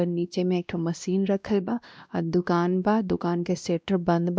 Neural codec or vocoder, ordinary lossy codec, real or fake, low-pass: codec, 16 kHz, 1 kbps, X-Codec, WavLM features, trained on Multilingual LibriSpeech; none; fake; none